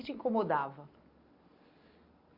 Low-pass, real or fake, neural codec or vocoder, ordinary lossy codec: 5.4 kHz; real; none; Opus, 64 kbps